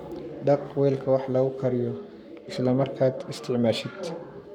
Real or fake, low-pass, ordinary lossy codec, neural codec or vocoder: fake; 19.8 kHz; none; codec, 44.1 kHz, 7.8 kbps, Pupu-Codec